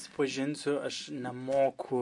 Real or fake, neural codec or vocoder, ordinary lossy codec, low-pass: real; none; MP3, 48 kbps; 10.8 kHz